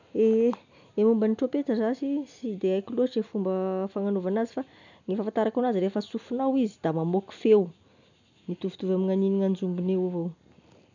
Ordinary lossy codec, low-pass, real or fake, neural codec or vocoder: none; 7.2 kHz; real; none